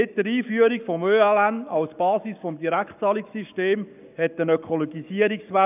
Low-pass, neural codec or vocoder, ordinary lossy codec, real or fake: 3.6 kHz; codec, 44.1 kHz, 7.8 kbps, Pupu-Codec; none; fake